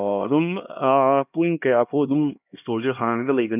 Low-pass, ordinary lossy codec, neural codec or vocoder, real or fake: 3.6 kHz; none; codec, 16 kHz, 1 kbps, X-Codec, HuBERT features, trained on LibriSpeech; fake